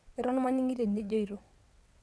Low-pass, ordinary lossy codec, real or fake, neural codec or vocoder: none; none; fake; vocoder, 22.05 kHz, 80 mel bands, WaveNeXt